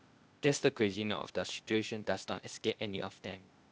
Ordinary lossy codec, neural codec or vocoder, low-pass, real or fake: none; codec, 16 kHz, 0.8 kbps, ZipCodec; none; fake